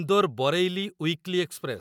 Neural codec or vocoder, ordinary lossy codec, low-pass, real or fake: none; none; 19.8 kHz; real